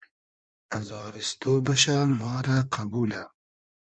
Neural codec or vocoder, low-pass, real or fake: codec, 16 kHz in and 24 kHz out, 1.1 kbps, FireRedTTS-2 codec; 9.9 kHz; fake